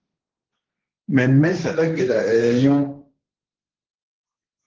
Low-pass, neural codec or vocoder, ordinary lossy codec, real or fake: 7.2 kHz; codec, 16 kHz, 1.1 kbps, Voila-Tokenizer; Opus, 16 kbps; fake